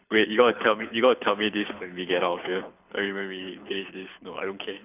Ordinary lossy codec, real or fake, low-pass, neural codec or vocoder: none; fake; 3.6 kHz; codec, 24 kHz, 6 kbps, HILCodec